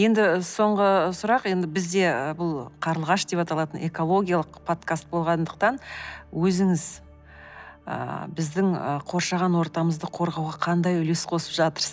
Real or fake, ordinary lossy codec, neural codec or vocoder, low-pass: real; none; none; none